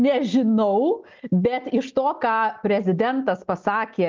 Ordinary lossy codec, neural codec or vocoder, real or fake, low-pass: Opus, 32 kbps; none; real; 7.2 kHz